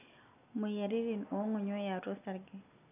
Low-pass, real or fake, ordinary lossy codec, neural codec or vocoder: 3.6 kHz; real; none; none